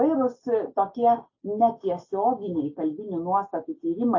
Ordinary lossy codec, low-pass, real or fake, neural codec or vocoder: AAC, 48 kbps; 7.2 kHz; real; none